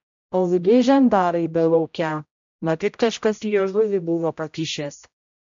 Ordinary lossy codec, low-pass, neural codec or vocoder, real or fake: MP3, 64 kbps; 7.2 kHz; codec, 16 kHz, 0.5 kbps, X-Codec, HuBERT features, trained on general audio; fake